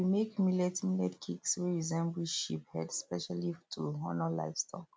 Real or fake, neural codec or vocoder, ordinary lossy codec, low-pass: real; none; none; none